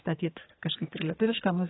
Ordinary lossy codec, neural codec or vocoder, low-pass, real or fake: AAC, 16 kbps; codec, 44.1 kHz, 2.6 kbps, SNAC; 7.2 kHz; fake